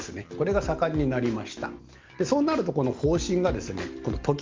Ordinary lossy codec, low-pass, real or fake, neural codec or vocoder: Opus, 24 kbps; 7.2 kHz; real; none